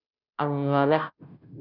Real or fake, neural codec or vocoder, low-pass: fake; codec, 16 kHz, 0.5 kbps, FunCodec, trained on Chinese and English, 25 frames a second; 5.4 kHz